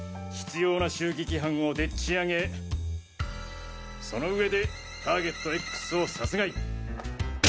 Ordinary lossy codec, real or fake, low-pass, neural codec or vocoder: none; real; none; none